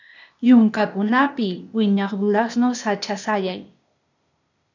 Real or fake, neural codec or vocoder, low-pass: fake; codec, 16 kHz, 0.8 kbps, ZipCodec; 7.2 kHz